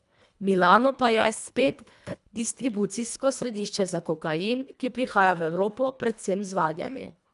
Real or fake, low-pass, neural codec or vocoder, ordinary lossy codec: fake; 10.8 kHz; codec, 24 kHz, 1.5 kbps, HILCodec; none